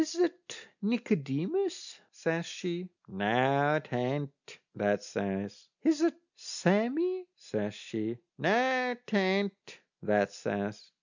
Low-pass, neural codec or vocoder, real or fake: 7.2 kHz; none; real